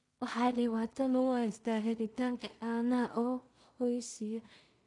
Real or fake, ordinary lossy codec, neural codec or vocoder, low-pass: fake; MP3, 64 kbps; codec, 16 kHz in and 24 kHz out, 0.4 kbps, LongCat-Audio-Codec, two codebook decoder; 10.8 kHz